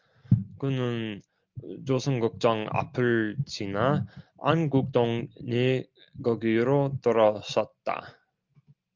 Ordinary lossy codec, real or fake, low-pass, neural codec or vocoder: Opus, 32 kbps; real; 7.2 kHz; none